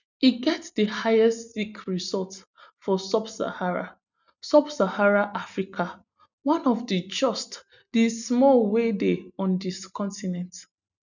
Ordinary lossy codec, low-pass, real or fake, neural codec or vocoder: none; 7.2 kHz; real; none